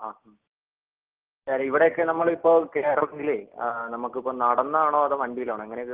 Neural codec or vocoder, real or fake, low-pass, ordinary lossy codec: none; real; 3.6 kHz; Opus, 16 kbps